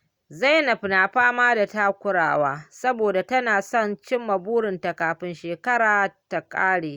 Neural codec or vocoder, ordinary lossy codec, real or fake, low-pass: none; Opus, 64 kbps; real; 19.8 kHz